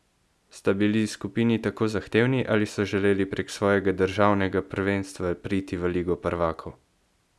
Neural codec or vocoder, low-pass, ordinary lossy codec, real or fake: none; none; none; real